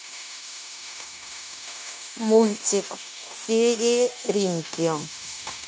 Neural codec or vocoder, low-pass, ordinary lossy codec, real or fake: codec, 16 kHz, 0.9 kbps, LongCat-Audio-Codec; none; none; fake